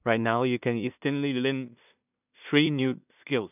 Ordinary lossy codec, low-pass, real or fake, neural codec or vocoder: none; 3.6 kHz; fake; codec, 16 kHz in and 24 kHz out, 0.4 kbps, LongCat-Audio-Codec, two codebook decoder